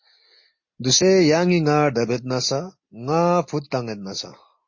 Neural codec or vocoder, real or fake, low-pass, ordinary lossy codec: none; real; 7.2 kHz; MP3, 32 kbps